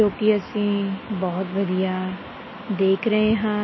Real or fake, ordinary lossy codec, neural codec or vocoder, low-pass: real; MP3, 24 kbps; none; 7.2 kHz